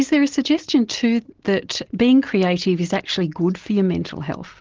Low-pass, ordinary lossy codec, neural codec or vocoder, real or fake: 7.2 kHz; Opus, 16 kbps; none; real